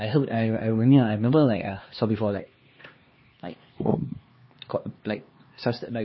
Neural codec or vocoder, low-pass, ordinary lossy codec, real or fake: codec, 16 kHz, 2 kbps, X-Codec, HuBERT features, trained on LibriSpeech; 7.2 kHz; MP3, 24 kbps; fake